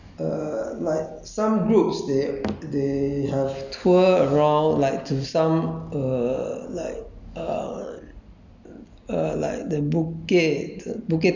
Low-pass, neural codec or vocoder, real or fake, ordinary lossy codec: 7.2 kHz; none; real; none